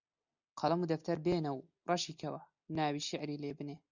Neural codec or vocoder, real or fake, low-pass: none; real; 7.2 kHz